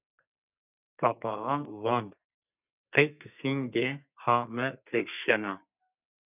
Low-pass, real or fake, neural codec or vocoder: 3.6 kHz; fake; codec, 32 kHz, 1.9 kbps, SNAC